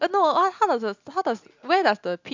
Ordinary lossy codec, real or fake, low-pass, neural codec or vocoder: MP3, 64 kbps; real; 7.2 kHz; none